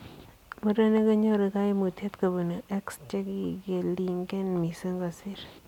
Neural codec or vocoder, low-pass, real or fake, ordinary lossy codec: none; 19.8 kHz; real; none